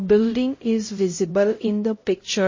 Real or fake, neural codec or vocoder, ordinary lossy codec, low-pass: fake; codec, 16 kHz, 0.5 kbps, X-Codec, HuBERT features, trained on LibriSpeech; MP3, 32 kbps; 7.2 kHz